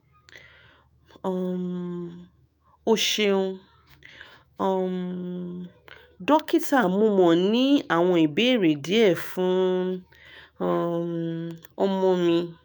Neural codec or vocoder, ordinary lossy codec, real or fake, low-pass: autoencoder, 48 kHz, 128 numbers a frame, DAC-VAE, trained on Japanese speech; none; fake; none